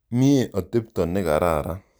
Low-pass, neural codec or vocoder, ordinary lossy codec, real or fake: none; none; none; real